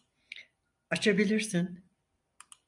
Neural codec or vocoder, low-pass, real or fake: none; 10.8 kHz; real